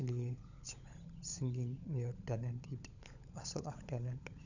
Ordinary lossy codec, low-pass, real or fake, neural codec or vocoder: none; 7.2 kHz; fake; codec, 16 kHz, 16 kbps, FunCodec, trained on LibriTTS, 50 frames a second